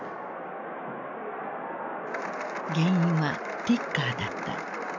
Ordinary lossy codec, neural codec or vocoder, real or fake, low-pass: MP3, 64 kbps; none; real; 7.2 kHz